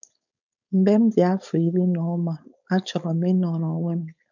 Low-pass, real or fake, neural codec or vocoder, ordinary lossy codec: 7.2 kHz; fake; codec, 16 kHz, 4.8 kbps, FACodec; none